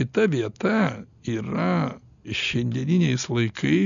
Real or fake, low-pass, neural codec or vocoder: real; 7.2 kHz; none